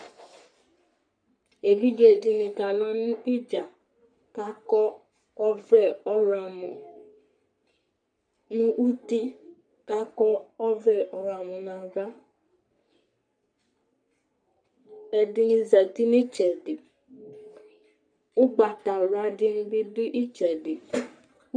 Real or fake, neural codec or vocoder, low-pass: fake; codec, 44.1 kHz, 3.4 kbps, Pupu-Codec; 9.9 kHz